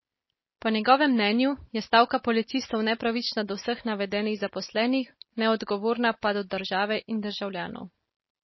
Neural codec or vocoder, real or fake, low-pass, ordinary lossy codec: none; real; 7.2 kHz; MP3, 24 kbps